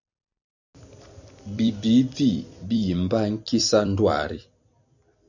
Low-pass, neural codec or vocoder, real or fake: 7.2 kHz; vocoder, 44.1 kHz, 128 mel bands every 256 samples, BigVGAN v2; fake